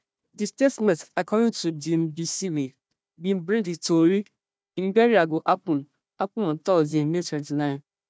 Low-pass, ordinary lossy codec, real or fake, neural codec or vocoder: none; none; fake; codec, 16 kHz, 1 kbps, FunCodec, trained on Chinese and English, 50 frames a second